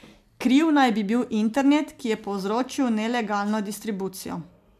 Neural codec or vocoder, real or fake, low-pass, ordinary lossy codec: none; real; 14.4 kHz; MP3, 96 kbps